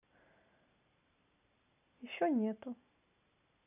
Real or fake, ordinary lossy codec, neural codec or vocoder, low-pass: real; none; none; 3.6 kHz